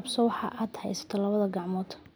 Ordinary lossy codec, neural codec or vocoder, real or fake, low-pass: none; none; real; none